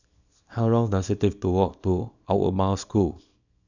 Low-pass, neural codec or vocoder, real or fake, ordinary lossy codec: 7.2 kHz; codec, 24 kHz, 0.9 kbps, WavTokenizer, small release; fake; none